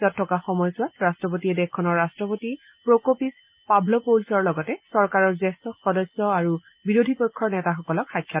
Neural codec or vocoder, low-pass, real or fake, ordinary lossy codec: none; 3.6 kHz; real; Opus, 32 kbps